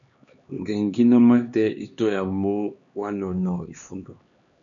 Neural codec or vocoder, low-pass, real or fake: codec, 16 kHz, 2 kbps, X-Codec, HuBERT features, trained on LibriSpeech; 7.2 kHz; fake